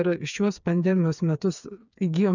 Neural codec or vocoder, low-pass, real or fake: codec, 16 kHz, 4 kbps, FreqCodec, smaller model; 7.2 kHz; fake